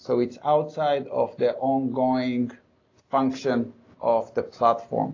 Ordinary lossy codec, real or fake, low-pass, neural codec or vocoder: AAC, 32 kbps; real; 7.2 kHz; none